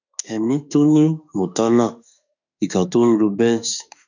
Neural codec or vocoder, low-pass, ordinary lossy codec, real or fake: autoencoder, 48 kHz, 32 numbers a frame, DAC-VAE, trained on Japanese speech; 7.2 kHz; none; fake